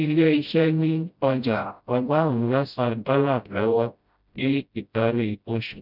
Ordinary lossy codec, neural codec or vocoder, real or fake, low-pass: none; codec, 16 kHz, 0.5 kbps, FreqCodec, smaller model; fake; 5.4 kHz